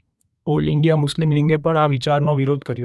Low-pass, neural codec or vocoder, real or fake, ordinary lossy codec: none; codec, 24 kHz, 1 kbps, SNAC; fake; none